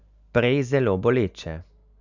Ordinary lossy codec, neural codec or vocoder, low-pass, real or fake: none; none; 7.2 kHz; real